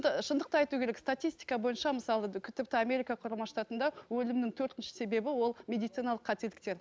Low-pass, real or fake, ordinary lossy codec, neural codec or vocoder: none; real; none; none